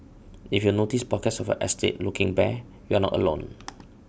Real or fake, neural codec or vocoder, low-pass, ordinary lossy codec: real; none; none; none